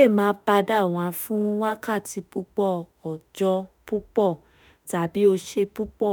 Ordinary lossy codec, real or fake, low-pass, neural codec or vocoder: none; fake; none; autoencoder, 48 kHz, 32 numbers a frame, DAC-VAE, trained on Japanese speech